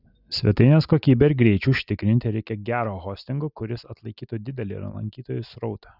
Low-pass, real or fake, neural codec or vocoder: 5.4 kHz; real; none